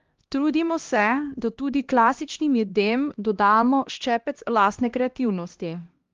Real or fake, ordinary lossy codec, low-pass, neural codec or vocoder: fake; Opus, 32 kbps; 7.2 kHz; codec, 16 kHz, 1 kbps, X-Codec, HuBERT features, trained on LibriSpeech